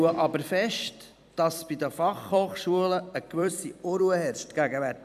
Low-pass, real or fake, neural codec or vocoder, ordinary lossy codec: 14.4 kHz; fake; vocoder, 44.1 kHz, 128 mel bands every 256 samples, BigVGAN v2; none